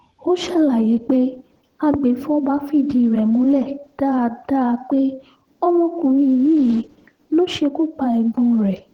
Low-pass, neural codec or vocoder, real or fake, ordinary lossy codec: 19.8 kHz; vocoder, 44.1 kHz, 128 mel bands every 512 samples, BigVGAN v2; fake; Opus, 16 kbps